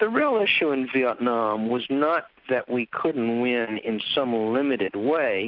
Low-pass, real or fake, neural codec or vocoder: 5.4 kHz; real; none